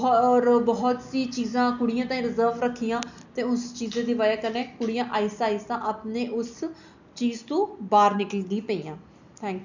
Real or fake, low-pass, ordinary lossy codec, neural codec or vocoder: real; 7.2 kHz; none; none